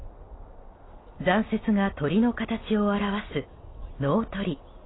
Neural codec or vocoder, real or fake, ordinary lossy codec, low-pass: none; real; AAC, 16 kbps; 7.2 kHz